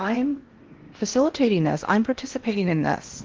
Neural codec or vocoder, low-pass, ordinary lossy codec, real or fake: codec, 16 kHz in and 24 kHz out, 0.8 kbps, FocalCodec, streaming, 65536 codes; 7.2 kHz; Opus, 16 kbps; fake